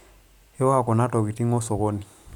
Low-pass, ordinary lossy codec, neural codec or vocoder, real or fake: 19.8 kHz; none; vocoder, 44.1 kHz, 128 mel bands every 512 samples, BigVGAN v2; fake